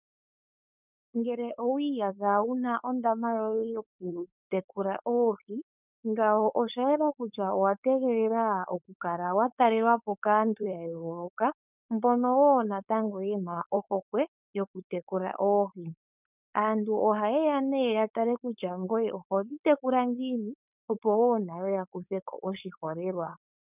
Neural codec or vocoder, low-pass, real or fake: codec, 16 kHz, 4.8 kbps, FACodec; 3.6 kHz; fake